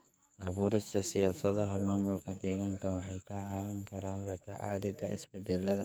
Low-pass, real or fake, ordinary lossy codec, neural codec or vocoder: none; fake; none; codec, 44.1 kHz, 2.6 kbps, SNAC